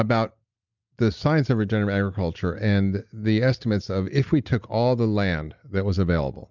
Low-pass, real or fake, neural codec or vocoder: 7.2 kHz; real; none